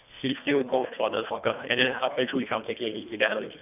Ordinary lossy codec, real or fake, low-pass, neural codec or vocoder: none; fake; 3.6 kHz; codec, 24 kHz, 1.5 kbps, HILCodec